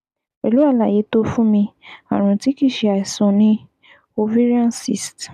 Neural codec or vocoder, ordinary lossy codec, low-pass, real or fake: none; none; 14.4 kHz; real